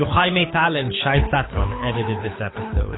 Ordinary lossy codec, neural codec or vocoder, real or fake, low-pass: AAC, 16 kbps; codec, 24 kHz, 6 kbps, HILCodec; fake; 7.2 kHz